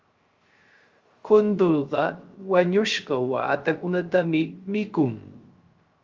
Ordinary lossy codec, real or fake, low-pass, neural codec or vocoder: Opus, 32 kbps; fake; 7.2 kHz; codec, 16 kHz, 0.3 kbps, FocalCodec